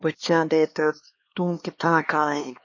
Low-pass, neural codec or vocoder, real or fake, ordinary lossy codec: 7.2 kHz; codec, 16 kHz, 2 kbps, X-Codec, HuBERT features, trained on LibriSpeech; fake; MP3, 32 kbps